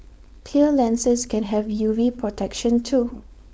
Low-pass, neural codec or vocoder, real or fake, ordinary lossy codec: none; codec, 16 kHz, 4.8 kbps, FACodec; fake; none